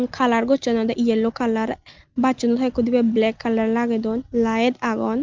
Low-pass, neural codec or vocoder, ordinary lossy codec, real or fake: 7.2 kHz; none; Opus, 32 kbps; real